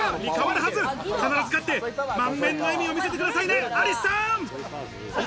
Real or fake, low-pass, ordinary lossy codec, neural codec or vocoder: real; none; none; none